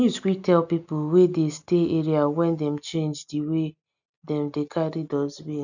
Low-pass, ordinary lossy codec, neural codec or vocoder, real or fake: 7.2 kHz; none; none; real